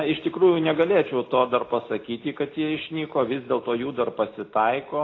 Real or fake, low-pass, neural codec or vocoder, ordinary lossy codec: real; 7.2 kHz; none; AAC, 32 kbps